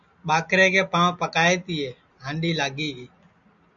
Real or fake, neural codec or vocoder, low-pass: real; none; 7.2 kHz